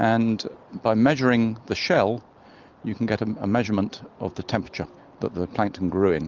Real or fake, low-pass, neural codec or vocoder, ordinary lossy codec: real; 7.2 kHz; none; Opus, 32 kbps